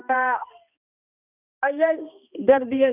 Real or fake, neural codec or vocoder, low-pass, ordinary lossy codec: fake; codec, 16 kHz, 1 kbps, X-Codec, HuBERT features, trained on balanced general audio; 3.6 kHz; none